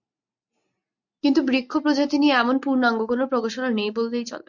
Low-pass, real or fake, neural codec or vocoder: 7.2 kHz; real; none